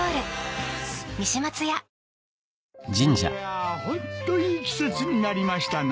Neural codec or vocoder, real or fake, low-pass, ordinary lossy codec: none; real; none; none